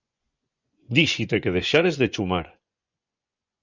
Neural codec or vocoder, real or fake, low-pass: vocoder, 24 kHz, 100 mel bands, Vocos; fake; 7.2 kHz